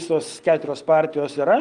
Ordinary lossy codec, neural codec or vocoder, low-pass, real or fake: Opus, 24 kbps; none; 9.9 kHz; real